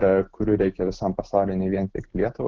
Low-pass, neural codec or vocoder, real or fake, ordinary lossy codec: 7.2 kHz; none; real; Opus, 16 kbps